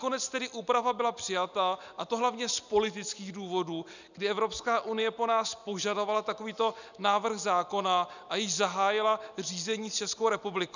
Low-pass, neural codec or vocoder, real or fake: 7.2 kHz; none; real